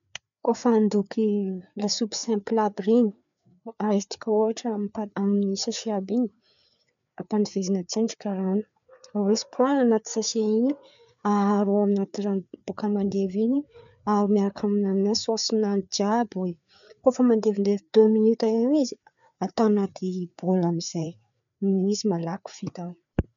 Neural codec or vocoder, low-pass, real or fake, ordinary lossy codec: codec, 16 kHz, 4 kbps, FreqCodec, larger model; 7.2 kHz; fake; none